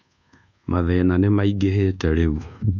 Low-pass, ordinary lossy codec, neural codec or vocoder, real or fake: 7.2 kHz; none; codec, 24 kHz, 1.2 kbps, DualCodec; fake